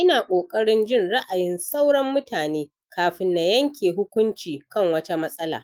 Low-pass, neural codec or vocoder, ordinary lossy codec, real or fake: 19.8 kHz; autoencoder, 48 kHz, 128 numbers a frame, DAC-VAE, trained on Japanese speech; Opus, 32 kbps; fake